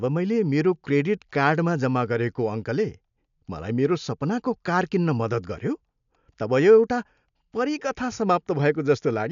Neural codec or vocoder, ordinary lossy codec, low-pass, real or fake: none; none; 7.2 kHz; real